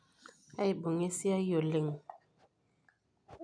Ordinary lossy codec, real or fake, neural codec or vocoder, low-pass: none; real; none; 9.9 kHz